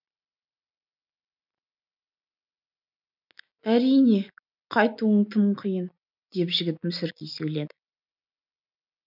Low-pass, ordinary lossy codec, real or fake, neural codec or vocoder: 5.4 kHz; none; real; none